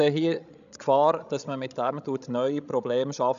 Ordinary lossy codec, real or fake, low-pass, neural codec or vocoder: none; fake; 7.2 kHz; codec, 16 kHz, 16 kbps, FreqCodec, larger model